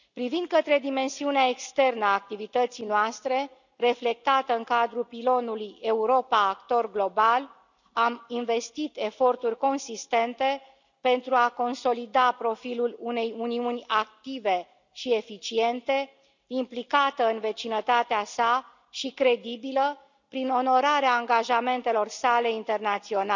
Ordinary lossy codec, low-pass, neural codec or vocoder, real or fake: none; 7.2 kHz; none; real